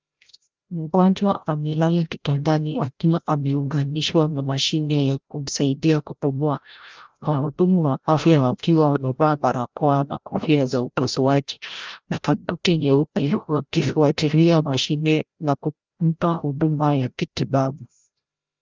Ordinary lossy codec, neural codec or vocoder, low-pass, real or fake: Opus, 32 kbps; codec, 16 kHz, 0.5 kbps, FreqCodec, larger model; 7.2 kHz; fake